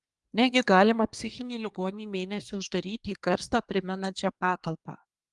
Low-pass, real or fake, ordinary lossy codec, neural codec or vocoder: 10.8 kHz; fake; Opus, 32 kbps; codec, 24 kHz, 1 kbps, SNAC